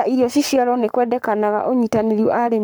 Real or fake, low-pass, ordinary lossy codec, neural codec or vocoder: fake; none; none; codec, 44.1 kHz, 7.8 kbps, Pupu-Codec